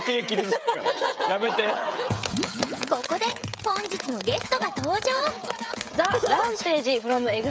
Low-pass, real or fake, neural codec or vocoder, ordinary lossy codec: none; fake; codec, 16 kHz, 16 kbps, FreqCodec, smaller model; none